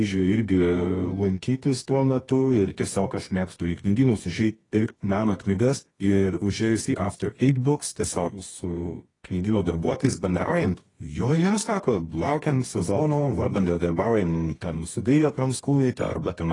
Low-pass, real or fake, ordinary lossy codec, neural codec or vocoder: 10.8 kHz; fake; AAC, 32 kbps; codec, 24 kHz, 0.9 kbps, WavTokenizer, medium music audio release